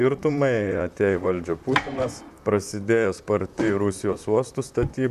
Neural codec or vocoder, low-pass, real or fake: vocoder, 44.1 kHz, 128 mel bands, Pupu-Vocoder; 14.4 kHz; fake